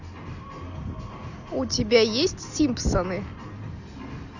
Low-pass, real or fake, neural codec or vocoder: 7.2 kHz; real; none